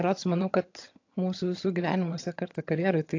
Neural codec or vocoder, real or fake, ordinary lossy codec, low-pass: vocoder, 22.05 kHz, 80 mel bands, HiFi-GAN; fake; AAC, 48 kbps; 7.2 kHz